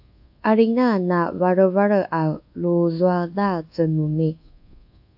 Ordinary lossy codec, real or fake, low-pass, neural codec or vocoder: AAC, 48 kbps; fake; 5.4 kHz; codec, 24 kHz, 1.2 kbps, DualCodec